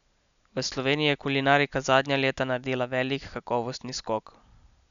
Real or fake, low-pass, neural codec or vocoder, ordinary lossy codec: real; 7.2 kHz; none; none